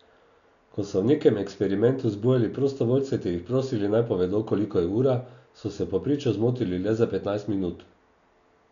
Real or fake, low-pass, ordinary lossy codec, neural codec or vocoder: real; 7.2 kHz; none; none